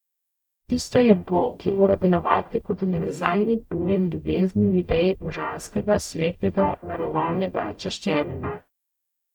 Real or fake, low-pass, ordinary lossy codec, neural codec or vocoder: fake; 19.8 kHz; none; codec, 44.1 kHz, 0.9 kbps, DAC